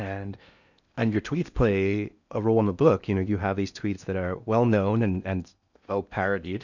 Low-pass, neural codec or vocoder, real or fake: 7.2 kHz; codec, 16 kHz in and 24 kHz out, 0.8 kbps, FocalCodec, streaming, 65536 codes; fake